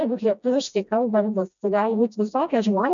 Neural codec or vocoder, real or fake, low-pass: codec, 16 kHz, 1 kbps, FreqCodec, smaller model; fake; 7.2 kHz